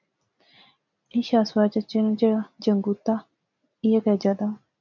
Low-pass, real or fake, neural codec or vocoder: 7.2 kHz; real; none